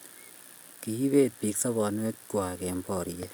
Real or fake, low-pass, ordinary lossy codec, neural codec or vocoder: real; none; none; none